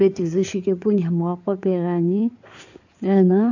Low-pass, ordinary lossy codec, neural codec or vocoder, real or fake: 7.2 kHz; none; codec, 16 kHz in and 24 kHz out, 2.2 kbps, FireRedTTS-2 codec; fake